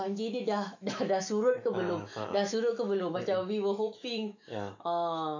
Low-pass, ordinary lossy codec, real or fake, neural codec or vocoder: 7.2 kHz; none; fake; autoencoder, 48 kHz, 128 numbers a frame, DAC-VAE, trained on Japanese speech